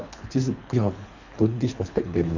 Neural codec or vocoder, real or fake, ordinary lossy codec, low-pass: codec, 24 kHz, 3 kbps, HILCodec; fake; none; 7.2 kHz